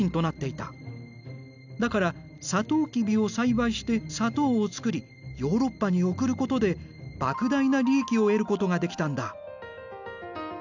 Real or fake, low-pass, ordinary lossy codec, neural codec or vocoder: real; 7.2 kHz; none; none